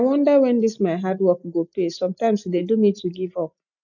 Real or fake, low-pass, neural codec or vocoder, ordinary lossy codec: real; 7.2 kHz; none; none